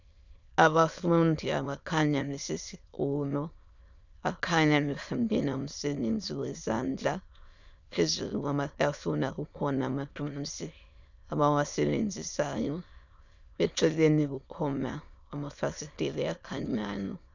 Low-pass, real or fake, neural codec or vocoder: 7.2 kHz; fake; autoencoder, 22.05 kHz, a latent of 192 numbers a frame, VITS, trained on many speakers